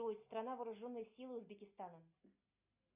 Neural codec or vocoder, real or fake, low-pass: none; real; 3.6 kHz